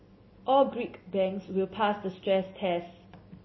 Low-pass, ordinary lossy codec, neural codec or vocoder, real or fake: 7.2 kHz; MP3, 24 kbps; none; real